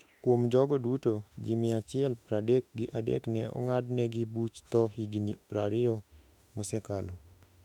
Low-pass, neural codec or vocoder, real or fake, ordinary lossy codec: 19.8 kHz; autoencoder, 48 kHz, 32 numbers a frame, DAC-VAE, trained on Japanese speech; fake; none